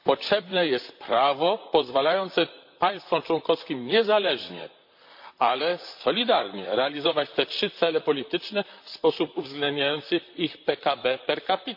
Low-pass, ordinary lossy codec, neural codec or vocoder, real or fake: 5.4 kHz; MP3, 48 kbps; vocoder, 44.1 kHz, 128 mel bands, Pupu-Vocoder; fake